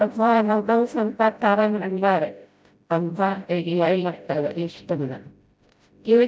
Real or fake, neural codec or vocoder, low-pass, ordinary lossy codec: fake; codec, 16 kHz, 0.5 kbps, FreqCodec, smaller model; none; none